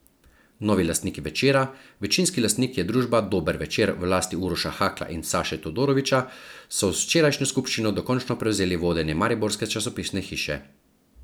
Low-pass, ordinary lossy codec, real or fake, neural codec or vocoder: none; none; real; none